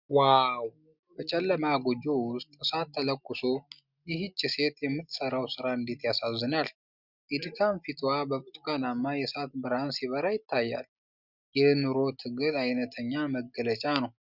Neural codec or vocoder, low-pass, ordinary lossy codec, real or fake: none; 5.4 kHz; Opus, 64 kbps; real